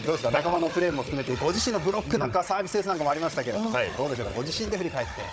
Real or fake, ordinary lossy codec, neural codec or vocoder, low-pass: fake; none; codec, 16 kHz, 16 kbps, FunCodec, trained on Chinese and English, 50 frames a second; none